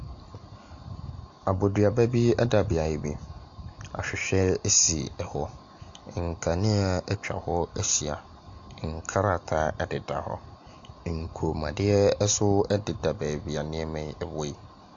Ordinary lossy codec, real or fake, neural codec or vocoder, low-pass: AAC, 64 kbps; real; none; 7.2 kHz